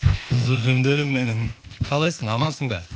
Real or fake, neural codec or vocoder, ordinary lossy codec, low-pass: fake; codec, 16 kHz, 0.8 kbps, ZipCodec; none; none